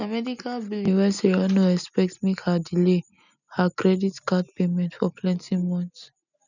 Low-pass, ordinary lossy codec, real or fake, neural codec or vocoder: 7.2 kHz; none; real; none